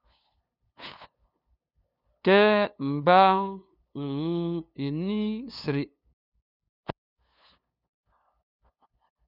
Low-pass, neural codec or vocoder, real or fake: 5.4 kHz; codec, 16 kHz, 2 kbps, FunCodec, trained on LibriTTS, 25 frames a second; fake